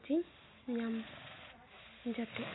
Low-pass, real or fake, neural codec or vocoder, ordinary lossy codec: 7.2 kHz; real; none; AAC, 16 kbps